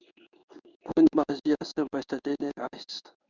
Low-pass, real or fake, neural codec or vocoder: 7.2 kHz; fake; codec, 16 kHz in and 24 kHz out, 1 kbps, XY-Tokenizer